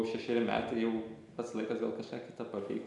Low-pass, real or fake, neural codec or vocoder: 10.8 kHz; real; none